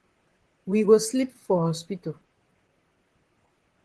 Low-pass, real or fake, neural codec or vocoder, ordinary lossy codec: 10.8 kHz; fake; vocoder, 44.1 kHz, 128 mel bands, Pupu-Vocoder; Opus, 16 kbps